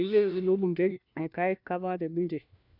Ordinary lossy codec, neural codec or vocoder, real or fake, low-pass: none; codec, 16 kHz, 1 kbps, FunCodec, trained on LibriTTS, 50 frames a second; fake; 5.4 kHz